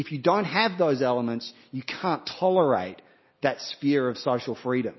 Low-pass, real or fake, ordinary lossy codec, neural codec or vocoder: 7.2 kHz; real; MP3, 24 kbps; none